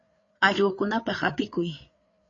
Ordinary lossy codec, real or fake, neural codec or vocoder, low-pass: AAC, 32 kbps; fake; codec, 16 kHz, 8 kbps, FreqCodec, larger model; 7.2 kHz